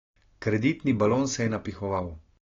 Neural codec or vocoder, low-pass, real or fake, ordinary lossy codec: none; 7.2 kHz; real; AAC, 32 kbps